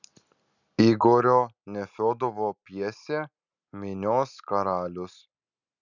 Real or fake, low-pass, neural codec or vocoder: real; 7.2 kHz; none